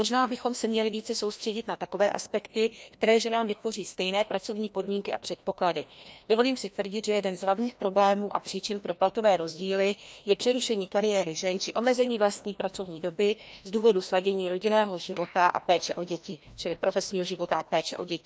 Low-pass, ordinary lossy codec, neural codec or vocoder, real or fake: none; none; codec, 16 kHz, 1 kbps, FreqCodec, larger model; fake